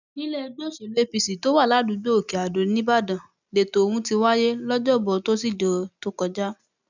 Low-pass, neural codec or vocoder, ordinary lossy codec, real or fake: 7.2 kHz; none; none; real